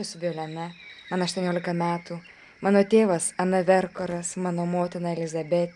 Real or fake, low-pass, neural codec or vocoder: real; 10.8 kHz; none